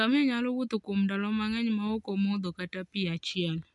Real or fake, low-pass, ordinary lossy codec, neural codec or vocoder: real; 10.8 kHz; none; none